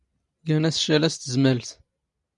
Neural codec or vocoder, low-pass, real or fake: none; 10.8 kHz; real